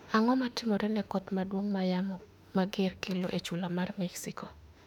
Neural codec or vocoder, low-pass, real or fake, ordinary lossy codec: autoencoder, 48 kHz, 32 numbers a frame, DAC-VAE, trained on Japanese speech; 19.8 kHz; fake; none